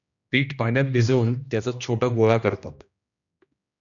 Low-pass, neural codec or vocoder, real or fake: 7.2 kHz; codec, 16 kHz, 1 kbps, X-Codec, HuBERT features, trained on general audio; fake